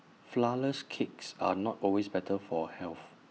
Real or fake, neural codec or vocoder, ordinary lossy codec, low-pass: real; none; none; none